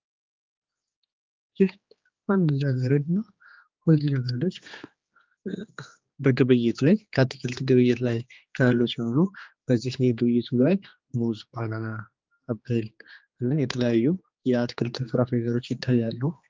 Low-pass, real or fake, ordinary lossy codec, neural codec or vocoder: 7.2 kHz; fake; Opus, 24 kbps; codec, 16 kHz, 2 kbps, X-Codec, HuBERT features, trained on general audio